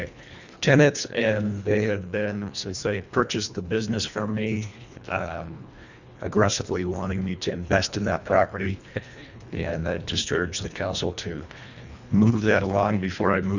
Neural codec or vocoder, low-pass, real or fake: codec, 24 kHz, 1.5 kbps, HILCodec; 7.2 kHz; fake